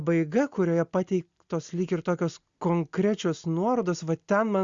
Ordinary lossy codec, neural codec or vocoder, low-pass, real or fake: Opus, 64 kbps; none; 7.2 kHz; real